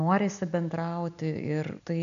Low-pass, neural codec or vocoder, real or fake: 7.2 kHz; none; real